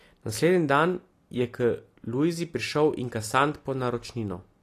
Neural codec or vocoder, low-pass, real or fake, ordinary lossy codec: none; 14.4 kHz; real; AAC, 48 kbps